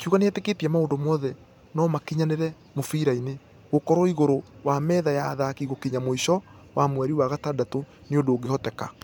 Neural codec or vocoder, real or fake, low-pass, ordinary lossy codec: vocoder, 44.1 kHz, 128 mel bands every 512 samples, BigVGAN v2; fake; none; none